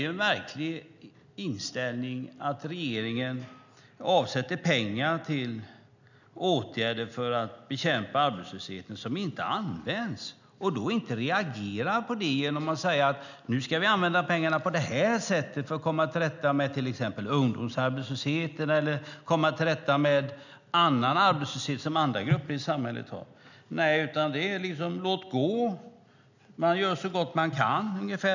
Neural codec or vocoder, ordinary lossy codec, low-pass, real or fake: none; none; 7.2 kHz; real